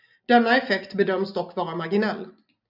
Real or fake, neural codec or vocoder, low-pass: real; none; 5.4 kHz